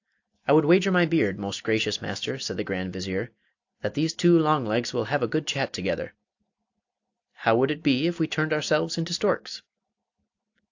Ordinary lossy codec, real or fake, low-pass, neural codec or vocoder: AAC, 48 kbps; real; 7.2 kHz; none